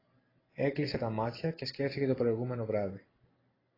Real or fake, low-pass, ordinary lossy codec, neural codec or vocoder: real; 5.4 kHz; AAC, 24 kbps; none